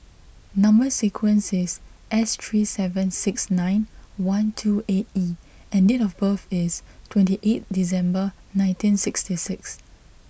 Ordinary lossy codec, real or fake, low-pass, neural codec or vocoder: none; real; none; none